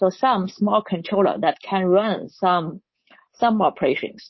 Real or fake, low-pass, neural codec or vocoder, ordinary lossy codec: fake; 7.2 kHz; codec, 24 kHz, 3.1 kbps, DualCodec; MP3, 24 kbps